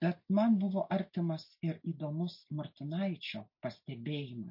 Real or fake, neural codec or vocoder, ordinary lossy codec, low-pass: real; none; MP3, 32 kbps; 5.4 kHz